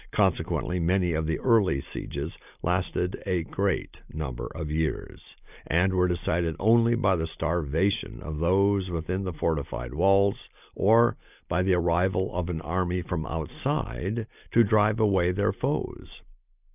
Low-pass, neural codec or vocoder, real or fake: 3.6 kHz; none; real